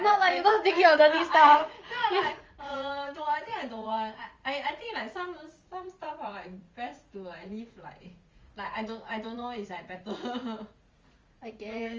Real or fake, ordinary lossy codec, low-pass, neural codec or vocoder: fake; Opus, 32 kbps; 7.2 kHz; vocoder, 44.1 kHz, 80 mel bands, Vocos